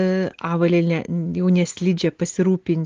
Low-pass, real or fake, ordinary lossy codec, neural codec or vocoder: 7.2 kHz; real; Opus, 16 kbps; none